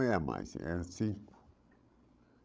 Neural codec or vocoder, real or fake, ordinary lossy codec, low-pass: codec, 16 kHz, 16 kbps, FreqCodec, larger model; fake; none; none